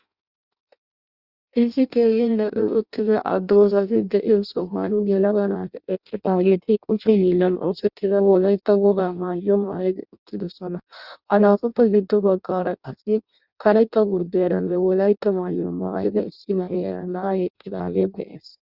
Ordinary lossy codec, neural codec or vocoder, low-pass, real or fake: Opus, 64 kbps; codec, 16 kHz in and 24 kHz out, 0.6 kbps, FireRedTTS-2 codec; 5.4 kHz; fake